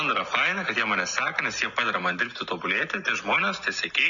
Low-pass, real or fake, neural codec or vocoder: 7.2 kHz; real; none